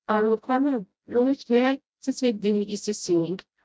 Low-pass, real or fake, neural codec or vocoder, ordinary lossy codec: none; fake; codec, 16 kHz, 0.5 kbps, FreqCodec, smaller model; none